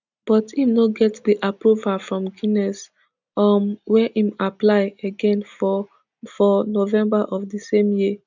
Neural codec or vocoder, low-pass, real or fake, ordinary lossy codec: none; 7.2 kHz; real; none